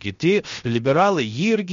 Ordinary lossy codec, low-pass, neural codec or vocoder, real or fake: MP3, 48 kbps; 7.2 kHz; codec, 16 kHz, 0.7 kbps, FocalCodec; fake